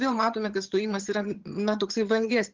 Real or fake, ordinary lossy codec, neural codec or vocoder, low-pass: fake; Opus, 16 kbps; vocoder, 22.05 kHz, 80 mel bands, HiFi-GAN; 7.2 kHz